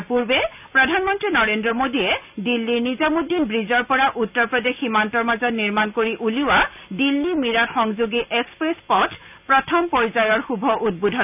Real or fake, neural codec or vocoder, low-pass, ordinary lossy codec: real; none; 3.6 kHz; none